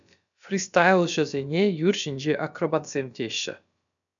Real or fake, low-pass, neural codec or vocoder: fake; 7.2 kHz; codec, 16 kHz, about 1 kbps, DyCAST, with the encoder's durations